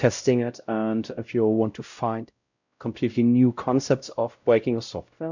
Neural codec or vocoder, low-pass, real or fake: codec, 16 kHz, 0.5 kbps, X-Codec, WavLM features, trained on Multilingual LibriSpeech; 7.2 kHz; fake